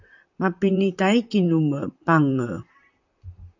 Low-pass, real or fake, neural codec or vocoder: 7.2 kHz; fake; vocoder, 44.1 kHz, 128 mel bands, Pupu-Vocoder